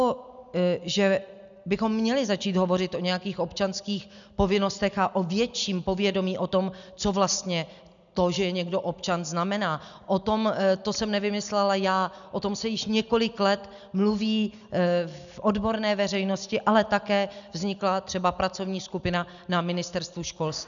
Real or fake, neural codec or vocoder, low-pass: real; none; 7.2 kHz